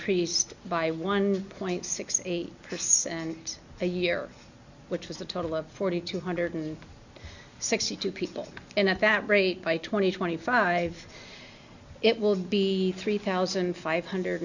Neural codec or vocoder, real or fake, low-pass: none; real; 7.2 kHz